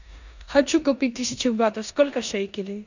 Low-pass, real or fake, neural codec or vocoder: 7.2 kHz; fake; codec, 16 kHz in and 24 kHz out, 0.9 kbps, LongCat-Audio-Codec, four codebook decoder